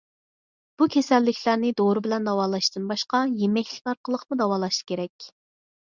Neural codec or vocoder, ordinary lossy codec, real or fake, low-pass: none; Opus, 64 kbps; real; 7.2 kHz